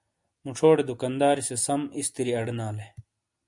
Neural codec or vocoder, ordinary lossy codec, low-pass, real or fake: none; MP3, 96 kbps; 10.8 kHz; real